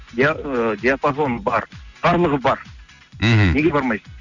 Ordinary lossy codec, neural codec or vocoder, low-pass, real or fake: none; none; 7.2 kHz; real